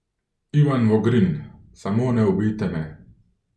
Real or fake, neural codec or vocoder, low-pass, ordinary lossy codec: real; none; none; none